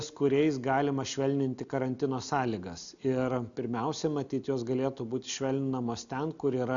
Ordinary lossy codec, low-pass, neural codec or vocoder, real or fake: MP3, 96 kbps; 7.2 kHz; none; real